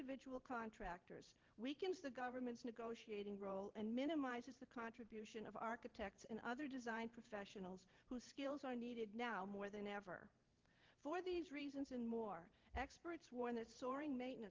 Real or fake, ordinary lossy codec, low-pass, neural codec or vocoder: fake; Opus, 16 kbps; 7.2 kHz; vocoder, 44.1 kHz, 128 mel bands every 512 samples, BigVGAN v2